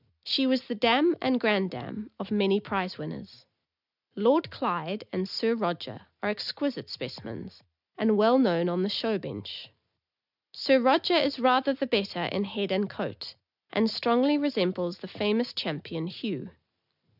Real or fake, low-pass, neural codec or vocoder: real; 5.4 kHz; none